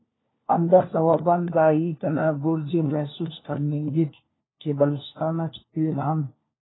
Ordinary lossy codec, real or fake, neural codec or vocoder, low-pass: AAC, 16 kbps; fake; codec, 16 kHz, 1 kbps, FunCodec, trained on LibriTTS, 50 frames a second; 7.2 kHz